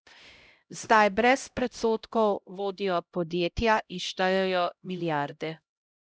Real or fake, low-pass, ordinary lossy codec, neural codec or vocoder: fake; none; none; codec, 16 kHz, 0.5 kbps, X-Codec, HuBERT features, trained on LibriSpeech